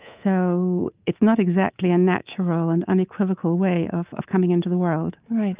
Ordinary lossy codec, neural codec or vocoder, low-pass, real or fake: Opus, 32 kbps; codec, 24 kHz, 3.1 kbps, DualCodec; 3.6 kHz; fake